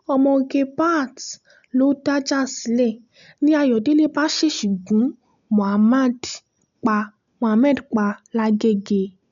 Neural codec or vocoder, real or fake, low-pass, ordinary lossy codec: none; real; 7.2 kHz; none